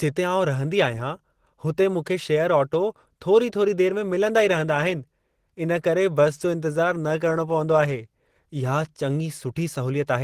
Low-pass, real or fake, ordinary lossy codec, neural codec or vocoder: 14.4 kHz; real; Opus, 16 kbps; none